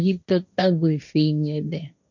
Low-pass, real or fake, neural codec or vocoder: 7.2 kHz; fake; codec, 16 kHz, 1.1 kbps, Voila-Tokenizer